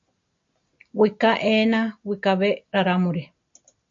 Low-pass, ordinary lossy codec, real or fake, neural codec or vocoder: 7.2 kHz; AAC, 64 kbps; real; none